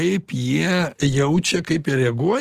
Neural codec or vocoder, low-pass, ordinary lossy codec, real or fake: none; 14.4 kHz; Opus, 16 kbps; real